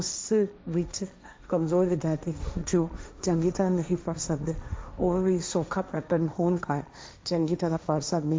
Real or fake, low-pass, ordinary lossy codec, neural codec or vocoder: fake; none; none; codec, 16 kHz, 1.1 kbps, Voila-Tokenizer